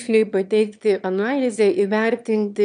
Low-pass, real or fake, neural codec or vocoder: 9.9 kHz; fake; autoencoder, 22.05 kHz, a latent of 192 numbers a frame, VITS, trained on one speaker